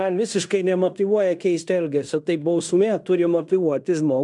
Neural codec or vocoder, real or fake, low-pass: codec, 16 kHz in and 24 kHz out, 0.9 kbps, LongCat-Audio-Codec, fine tuned four codebook decoder; fake; 10.8 kHz